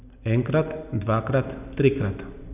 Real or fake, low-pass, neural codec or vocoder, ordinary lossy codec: real; 3.6 kHz; none; none